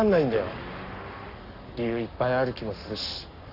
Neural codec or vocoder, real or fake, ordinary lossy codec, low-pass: codec, 44.1 kHz, 7.8 kbps, Pupu-Codec; fake; none; 5.4 kHz